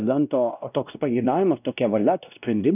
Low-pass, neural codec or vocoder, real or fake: 3.6 kHz; codec, 16 kHz, 1 kbps, X-Codec, WavLM features, trained on Multilingual LibriSpeech; fake